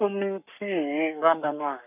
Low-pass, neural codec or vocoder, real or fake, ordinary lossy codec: 3.6 kHz; codec, 44.1 kHz, 7.8 kbps, Pupu-Codec; fake; none